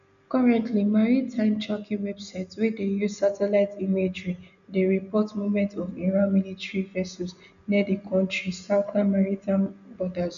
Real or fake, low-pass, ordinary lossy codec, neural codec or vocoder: real; 7.2 kHz; none; none